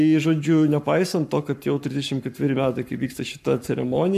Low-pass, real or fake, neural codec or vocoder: 14.4 kHz; fake; codec, 44.1 kHz, 7.8 kbps, Pupu-Codec